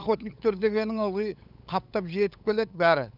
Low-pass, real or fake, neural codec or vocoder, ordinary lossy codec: 5.4 kHz; fake; codec, 16 kHz, 8 kbps, FunCodec, trained on LibriTTS, 25 frames a second; none